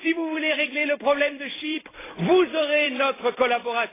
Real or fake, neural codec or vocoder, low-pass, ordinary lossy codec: fake; vocoder, 44.1 kHz, 128 mel bands every 256 samples, BigVGAN v2; 3.6 kHz; AAC, 16 kbps